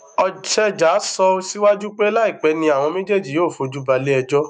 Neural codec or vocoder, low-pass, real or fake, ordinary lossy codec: autoencoder, 48 kHz, 128 numbers a frame, DAC-VAE, trained on Japanese speech; 9.9 kHz; fake; none